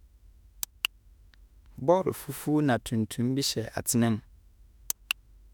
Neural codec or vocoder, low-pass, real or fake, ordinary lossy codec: autoencoder, 48 kHz, 32 numbers a frame, DAC-VAE, trained on Japanese speech; none; fake; none